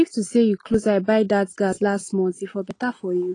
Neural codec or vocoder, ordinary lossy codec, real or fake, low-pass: none; AAC, 32 kbps; real; 9.9 kHz